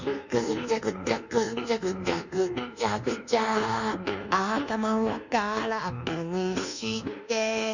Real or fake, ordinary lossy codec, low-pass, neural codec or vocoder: fake; none; 7.2 kHz; codec, 24 kHz, 1.2 kbps, DualCodec